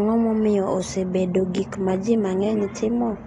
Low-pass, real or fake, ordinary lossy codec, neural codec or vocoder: 10.8 kHz; real; AAC, 32 kbps; none